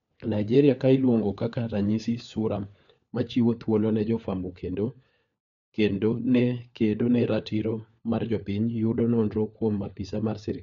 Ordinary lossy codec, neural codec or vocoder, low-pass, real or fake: none; codec, 16 kHz, 4 kbps, FunCodec, trained on LibriTTS, 50 frames a second; 7.2 kHz; fake